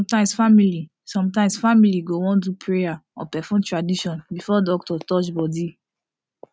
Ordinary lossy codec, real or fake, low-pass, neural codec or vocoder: none; real; none; none